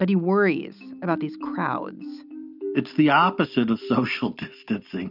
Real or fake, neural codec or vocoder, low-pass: real; none; 5.4 kHz